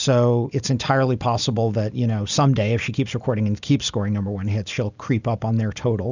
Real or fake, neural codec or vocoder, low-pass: real; none; 7.2 kHz